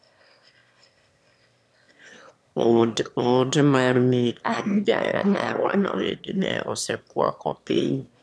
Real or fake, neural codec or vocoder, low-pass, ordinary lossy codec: fake; autoencoder, 22.05 kHz, a latent of 192 numbers a frame, VITS, trained on one speaker; none; none